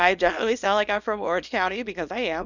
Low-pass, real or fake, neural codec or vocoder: 7.2 kHz; fake; codec, 24 kHz, 0.9 kbps, WavTokenizer, small release